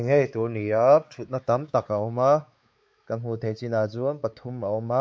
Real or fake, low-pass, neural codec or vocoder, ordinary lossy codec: fake; none; codec, 16 kHz, 4 kbps, X-Codec, WavLM features, trained on Multilingual LibriSpeech; none